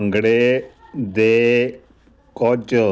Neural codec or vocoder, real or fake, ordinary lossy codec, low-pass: none; real; none; none